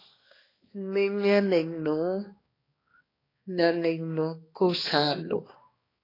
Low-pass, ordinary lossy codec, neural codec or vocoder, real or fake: 5.4 kHz; AAC, 24 kbps; codec, 16 kHz, 2 kbps, X-Codec, HuBERT features, trained on balanced general audio; fake